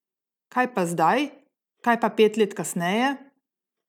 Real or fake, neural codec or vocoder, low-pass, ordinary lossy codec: real; none; 19.8 kHz; none